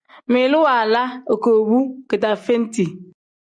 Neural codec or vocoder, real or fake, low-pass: none; real; 9.9 kHz